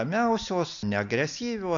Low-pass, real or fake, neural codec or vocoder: 7.2 kHz; real; none